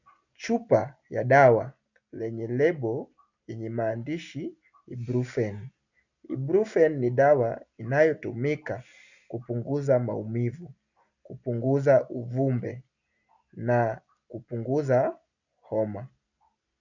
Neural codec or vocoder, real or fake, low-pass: none; real; 7.2 kHz